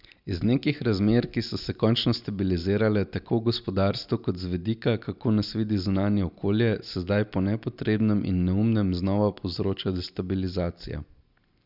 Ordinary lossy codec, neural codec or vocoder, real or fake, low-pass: none; none; real; 5.4 kHz